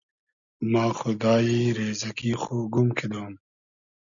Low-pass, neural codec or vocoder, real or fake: 7.2 kHz; none; real